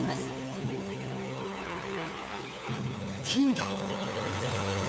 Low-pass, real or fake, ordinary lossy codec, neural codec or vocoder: none; fake; none; codec, 16 kHz, 4 kbps, FunCodec, trained on LibriTTS, 50 frames a second